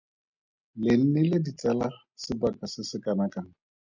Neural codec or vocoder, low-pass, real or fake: none; 7.2 kHz; real